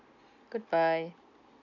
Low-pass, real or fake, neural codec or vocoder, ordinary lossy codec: 7.2 kHz; real; none; none